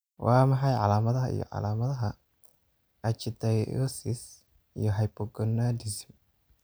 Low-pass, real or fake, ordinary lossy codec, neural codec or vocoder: none; real; none; none